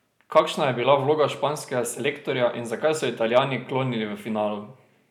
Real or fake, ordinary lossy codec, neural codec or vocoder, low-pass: real; none; none; 19.8 kHz